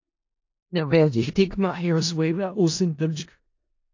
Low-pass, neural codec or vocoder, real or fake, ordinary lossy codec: 7.2 kHz; codec, 16 kHz in and 24 kHz out, 0.4 kbps, LongCat-Audio-Codec, four codebook decoder; fake; AAC, 48 kbps